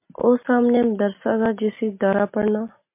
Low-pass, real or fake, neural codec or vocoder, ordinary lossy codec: 3.6 kHz; real; none; MP3, 24 kbps